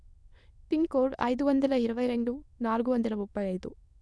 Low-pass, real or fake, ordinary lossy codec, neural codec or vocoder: none; fake; none; autoencoder, 22.05 kHz, a latent of 192 numbers a frame, VITS, trained on many speakers